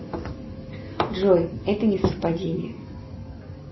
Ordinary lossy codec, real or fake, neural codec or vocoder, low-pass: MP3, 24 kbps; real; none; 7.2 kHz